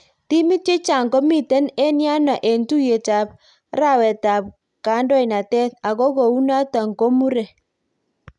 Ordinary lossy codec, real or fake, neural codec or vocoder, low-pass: none; real; none; 10.8 kHz